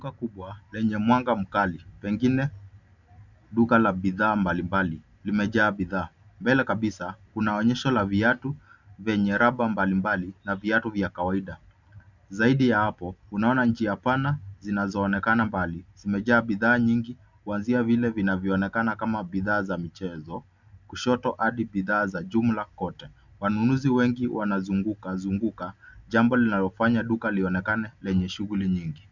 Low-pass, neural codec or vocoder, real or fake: 7.2 kHz; none; real